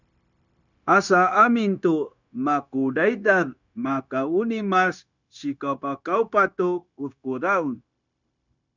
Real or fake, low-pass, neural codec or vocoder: fake; 7.2 kHz; codec, 16 kHz, 0.9 kbps, LongCat-Audio-Codec